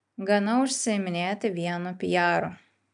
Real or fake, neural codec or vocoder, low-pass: real; none; 9.9 kHz